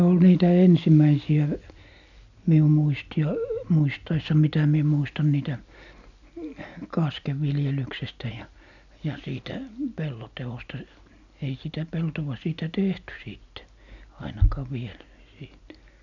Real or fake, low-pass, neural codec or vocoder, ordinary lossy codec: real; 7.2 kHz; none; none